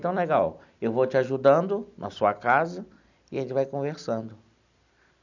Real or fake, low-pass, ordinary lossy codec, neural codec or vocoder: real; 7.2 kHz; none; none